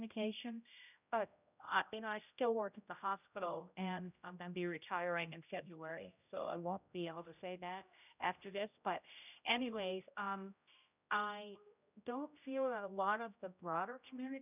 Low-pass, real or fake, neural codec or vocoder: 3.6 kHz; fake; codec, 16 kHz, 0.5 kbps, X-Codec, HuBERT features, trained on general audio